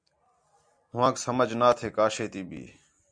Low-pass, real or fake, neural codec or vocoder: 9.9 kHz; real; none